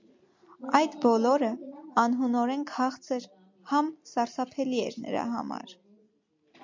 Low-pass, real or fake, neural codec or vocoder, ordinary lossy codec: 7.2 kHz; real; none; MP3, 48 kbps